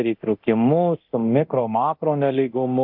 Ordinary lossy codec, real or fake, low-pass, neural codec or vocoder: AAC, 48 kbps; fake; 5.4 kHz; codec, 24 kHz, 0.9 kbps, DualCodec